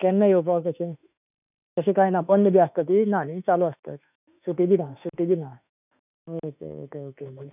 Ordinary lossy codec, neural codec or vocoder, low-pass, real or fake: none; autoencoder, 48 kHz, 32 numbers a frame, DAC-VAE, trained on Japanese speech; 3.6 kHz; fake